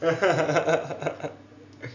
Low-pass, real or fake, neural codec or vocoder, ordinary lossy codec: 7.2 kHz; real; none; none